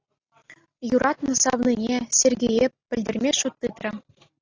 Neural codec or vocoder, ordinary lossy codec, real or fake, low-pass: none; AAC, 32 kbps; real; 7.2 kHz